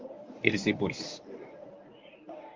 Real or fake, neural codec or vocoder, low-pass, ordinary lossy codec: fake; codec, 24 kHz, 0.9 kbps, WavTokenizer, medium speech release version 1; 7.2 kHz; Opus, 32 kbps